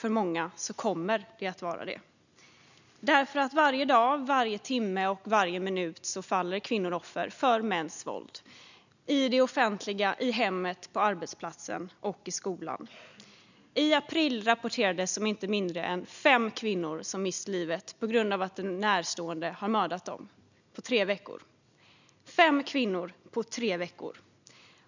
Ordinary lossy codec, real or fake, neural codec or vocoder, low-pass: none; real; none; 7.2 kHz